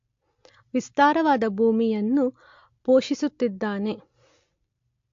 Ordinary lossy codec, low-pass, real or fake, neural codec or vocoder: AAC, 48 kbps; 7.2 kHz; real; none